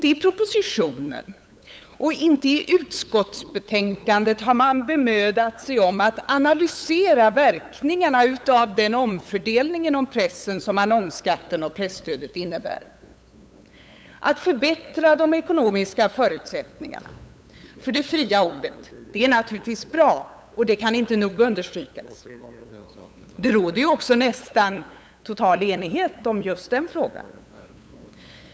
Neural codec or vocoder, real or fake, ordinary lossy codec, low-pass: codec, 16 kHz, 8 kbps, FunCodec, trained on LibriTTS, 25 frames a second; fake; none; none